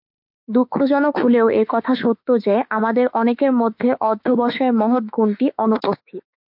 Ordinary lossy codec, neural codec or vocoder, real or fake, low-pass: MP3, 48 kbps; autoencoder, 48 kHz, 32 numbers a frame, DAC-VAE, trained on Japanese speech; fake; 5.4 kHz